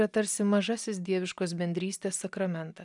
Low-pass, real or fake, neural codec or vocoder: 10.8 kHz; real; none